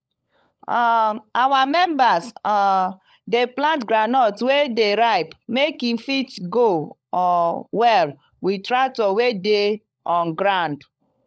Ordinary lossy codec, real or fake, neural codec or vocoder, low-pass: none; fake; codec, 16 kHz, 16 kbps, FunCodec, trained on LibriTTS, 50 frames a second; none